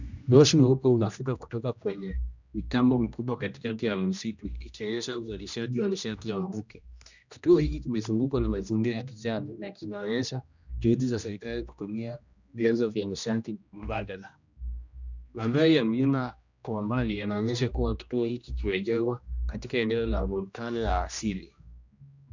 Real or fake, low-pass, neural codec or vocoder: fake; 7.2 kHz; codec, 16 kHz, 1 kbps, X-Codec, HuBERT features, trained on general audio